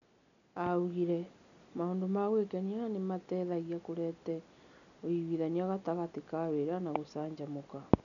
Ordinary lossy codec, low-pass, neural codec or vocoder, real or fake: none; 7.2 kHz; none; real